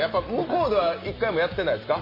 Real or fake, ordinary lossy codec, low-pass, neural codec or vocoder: real; MP3, 24 kbps; 5.4 kHz; none